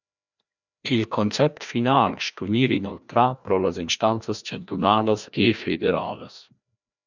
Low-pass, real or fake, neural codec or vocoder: 7.2 kHz; fake; codec, 16 kHz, 1 kbps, FreqCodec, larger model